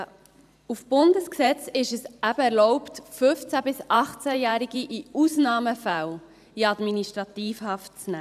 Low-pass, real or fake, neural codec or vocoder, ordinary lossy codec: 14.4 kHz; fake; vocoder, 44.1 kHz, 128 mel bands every 512 samples, BigVGAN v2; none